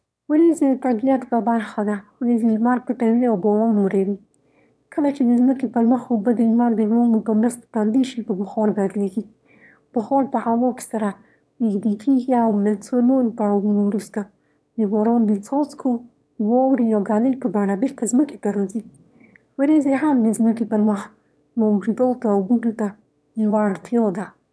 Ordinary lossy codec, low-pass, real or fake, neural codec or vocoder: none; none; fake; autoencoder, 22.05 kHz, a latent of 192 numbers a frame, VITS, trained on one speaker